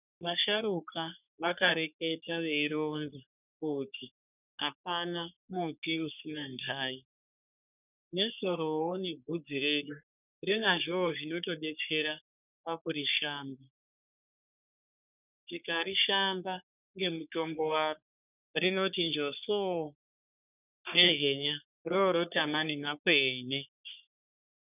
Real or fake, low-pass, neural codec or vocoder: fake; 3.6 kHz; codec, 44.1 kHz, 3.4 kbps, Pupu-Codec